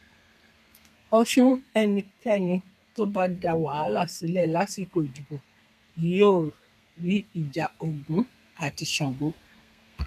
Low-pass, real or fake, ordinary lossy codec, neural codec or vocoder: 14.4 kHz; fake; none; codec, 32 kHz, 1.9 kbps, SNAC